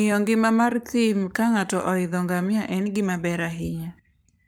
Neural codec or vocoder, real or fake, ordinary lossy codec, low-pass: codec, 44.1 kHz, 7.8 kbps, Pupu-Codec; fake; none; none